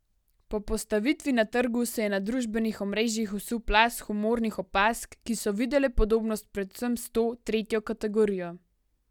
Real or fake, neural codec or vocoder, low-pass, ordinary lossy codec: real; none; 19.8 kHz; none